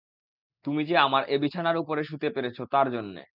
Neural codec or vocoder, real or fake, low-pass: none; real; 5.4 kHz